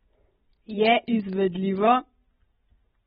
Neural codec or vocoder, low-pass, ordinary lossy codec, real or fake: none; 19.8 kHz; AAC, 16 kbps; real